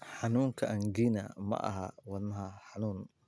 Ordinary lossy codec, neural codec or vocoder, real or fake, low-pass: none; none; real; none